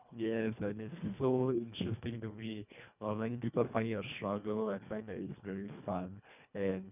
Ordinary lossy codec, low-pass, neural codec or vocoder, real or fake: none; 3.6 kHz; codec, 24 kHz, 1.5 kbps, HILCodec; fake